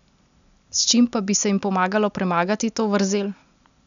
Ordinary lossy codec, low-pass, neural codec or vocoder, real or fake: none; 7.2 kHz; none; real